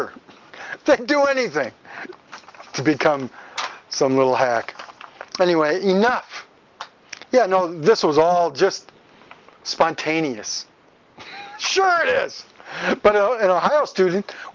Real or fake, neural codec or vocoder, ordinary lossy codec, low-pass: real; none; Opus, 32 kbps; 7.2 kHz